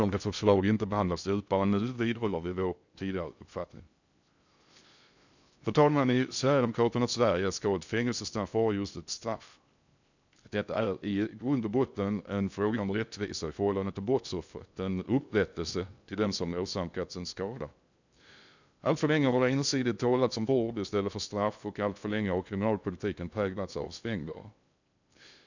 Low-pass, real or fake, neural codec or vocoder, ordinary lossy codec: 7.2 kHz; fake; codec, 16 kHz in and 24 kHz out, 0.6 kbps, FocalCodec, streaming, 2048 codes; none